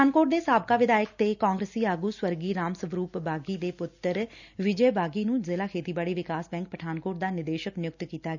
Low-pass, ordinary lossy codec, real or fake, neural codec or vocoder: 7.2 kHz; none; real; none